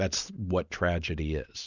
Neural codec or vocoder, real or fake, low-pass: none; real; 7.2 kHz